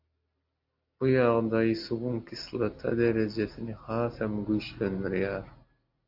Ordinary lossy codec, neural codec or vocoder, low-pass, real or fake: AAC, 48 kbps; none; 5.4 kHz; real